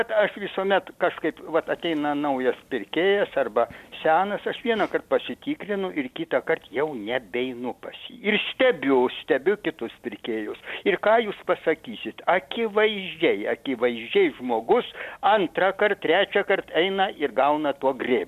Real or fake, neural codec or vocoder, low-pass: real; none; 14.4 kHz